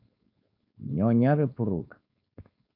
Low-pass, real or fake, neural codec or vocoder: 5.4 kHz; fake; codec, 16 kHz, 4.8 kbps, FACodec